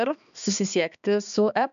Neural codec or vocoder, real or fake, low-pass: codec, 16 kHz, 2 kbps, X-Codec, HuBERT features, trained on balanced general audio; fake; 7.2 kHz